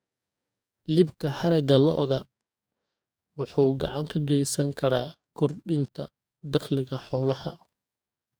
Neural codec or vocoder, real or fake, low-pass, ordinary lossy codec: codec, 44.1 kHz, 2.6 kbps, DAC; fake; none; none